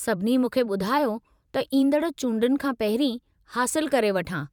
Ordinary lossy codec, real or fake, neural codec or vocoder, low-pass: none; fake; vocoder, 44.1 kHz, 128 mel bands every 512 samples, BigVGAN v2; 19.8 kHz